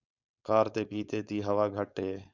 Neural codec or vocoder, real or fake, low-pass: codec, 16 kHz, 4.8 kbps, FACodec; fake; 7.2 kHz